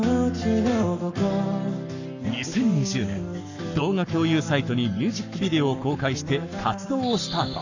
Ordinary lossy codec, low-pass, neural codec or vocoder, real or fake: none; 7.2 kHz; codec, 44.1 kHz, 7.8 kbps, Pupu-Codec; fake